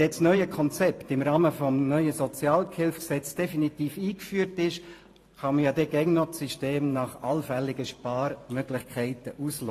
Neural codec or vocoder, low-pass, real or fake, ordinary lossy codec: vocoder, 44.1 kHz, 128 mel bands every 512 samples, BigVGAN v2; 14.4 kHz; fake; AAC, 48 kbps